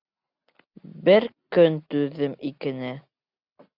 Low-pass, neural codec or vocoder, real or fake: 5.4 kHz; none; real